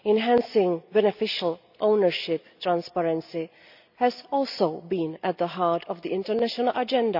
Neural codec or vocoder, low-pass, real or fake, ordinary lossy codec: none; 5.4 kHz; real; none